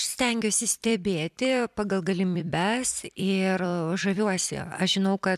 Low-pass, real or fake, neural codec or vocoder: 14.4 kHz; fake; vocoder, 44.1 kHz, 128 mel bands every 256 samples, BigVGAN v2